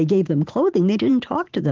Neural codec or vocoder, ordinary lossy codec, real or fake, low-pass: vocoder, 44.1 kHz, 128 mel bands every 512 samples, BigVGAN v2; Opus, 16 kbps; fake; 7.2 kHz